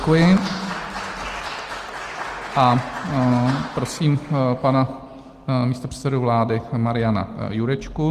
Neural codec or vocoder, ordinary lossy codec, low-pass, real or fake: none; Opus, 24 kbps; 14.4 kHz; real